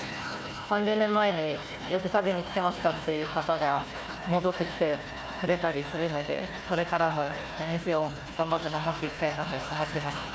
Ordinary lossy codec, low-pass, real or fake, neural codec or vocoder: none; none; fake; codec, 16 kHz, 1 kbps, FunCodec, trained on Chinese and English, 50 frames a second